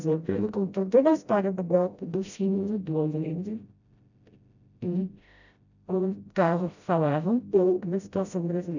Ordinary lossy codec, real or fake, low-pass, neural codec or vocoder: none; fake; 7.2 kHz; codec, 16 kHz, 0.5 kbps, FreqCodec, smaller model